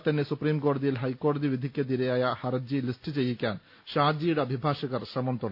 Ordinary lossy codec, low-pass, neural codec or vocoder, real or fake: AAC, 48 kbps; 5.4 kHz; none; real